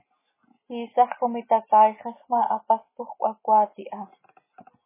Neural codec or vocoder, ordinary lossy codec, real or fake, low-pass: none; MP3, 16 kbps; real; 3.6 kHz